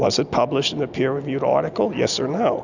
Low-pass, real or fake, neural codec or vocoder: 7.2 kHz; real; none